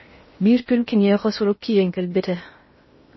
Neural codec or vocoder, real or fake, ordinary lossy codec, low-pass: codec, 16 kHz in and 24 kHz out, 0.6 kbps, FocalCodec, streaming, 2048 codes; fake; MP3, 24 kbps; 7.2 kHz